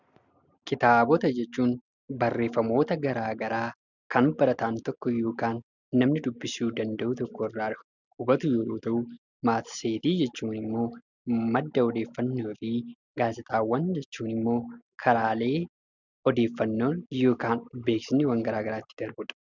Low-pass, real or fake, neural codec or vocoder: 7.2 kHz; real; none